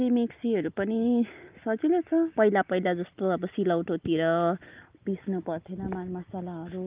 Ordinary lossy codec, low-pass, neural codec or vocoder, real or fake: Opus, 32 kbps; 3.6 kHz; none; real